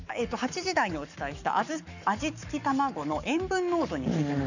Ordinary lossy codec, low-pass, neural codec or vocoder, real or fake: none; 7.2 kHz; codec, 44.1 kHz, 7.8 kbps, Pupu-Codec; fake